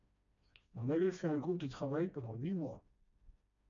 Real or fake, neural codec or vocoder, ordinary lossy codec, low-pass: fake; codec, 16 kHz, 1 kbps, FreqCodec, smaller model; AAC, 64 kbps; 7.2 kHz